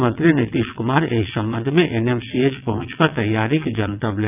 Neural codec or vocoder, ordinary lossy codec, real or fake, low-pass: vocoder, 22.05 kHz, 80 mel bands, WaveNeXt; none; fake; 3.6 kHz